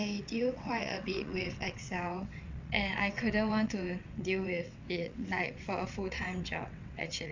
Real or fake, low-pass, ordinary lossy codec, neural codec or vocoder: fake; 7.2 kHz; MP3, 64 kbps; vocoder, 22.05 kHz, 80 mel bands, WaveNeXt